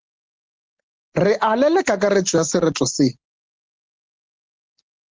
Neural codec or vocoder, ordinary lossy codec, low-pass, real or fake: none; Opus, 16 kbps; 7.2 kHz; real